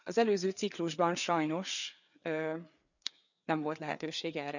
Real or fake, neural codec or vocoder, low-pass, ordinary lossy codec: fake; codec, 16 kHz, 4 kbps, FreqCodec, larger model; 7.2 kHz; none